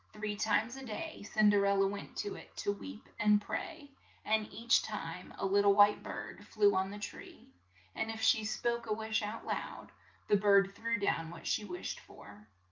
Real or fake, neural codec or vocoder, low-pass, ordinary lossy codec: real; none; 7.2 kHz; Opus, 24 kbps